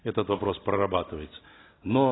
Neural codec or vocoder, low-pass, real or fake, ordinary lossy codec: none; 7.2 kHz; real; AAC, 16 kbps